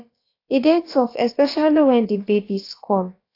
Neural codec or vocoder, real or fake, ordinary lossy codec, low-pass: codec, 16 kHz, about 1 kbps, DyCAST, with the encoder's durations; fake; AAC, 32 kbps; 5.4 kHz